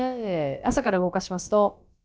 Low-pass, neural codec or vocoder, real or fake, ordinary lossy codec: none; codec, 16 kHz, about 1 kbps, DyCAST, with the encoder's durations; fake; none